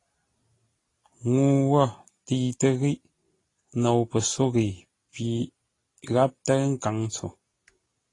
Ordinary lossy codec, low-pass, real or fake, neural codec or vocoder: AAC, 32 kbps; 10.8 kHz; real; none